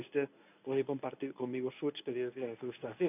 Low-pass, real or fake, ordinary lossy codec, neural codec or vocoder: 3.6 kHz; fake; none; codec, 24 kHz, 0.9 kbps, WavTokenizer, medium speech release version 1